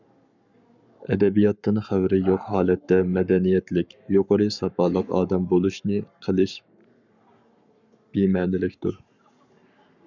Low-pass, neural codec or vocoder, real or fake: 7.2 kHz; codec, 44.1 kHz, 7.8 kbps, Pupu-Codec; fake